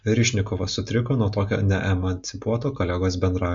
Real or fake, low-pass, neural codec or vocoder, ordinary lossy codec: real; 7.2 kHz; none; MP3, 48 kbps